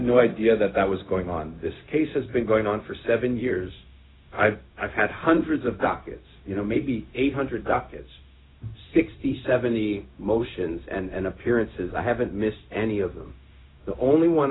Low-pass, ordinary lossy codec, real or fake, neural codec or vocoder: 7.2 kHz; AAC, 16 kbps; fake; codec, 16 kHz, 0.4 kbps, LongCat-Audio-Codec